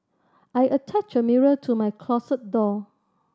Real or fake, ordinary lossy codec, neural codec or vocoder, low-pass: real; none; none; none